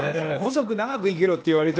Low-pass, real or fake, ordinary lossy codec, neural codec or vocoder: none; fake; none; codec, 16 kHz, 0.8 kbps, ZipCodec